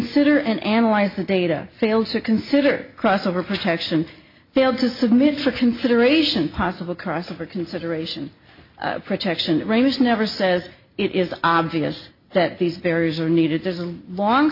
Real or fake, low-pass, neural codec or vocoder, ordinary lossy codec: real; 5.4 kHz; none; MP3, 32 kbps